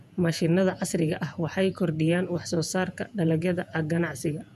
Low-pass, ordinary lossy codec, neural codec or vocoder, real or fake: 14.4 kHz; none; vocoder, 48 kHz, 128 mel bands, Vocos; fake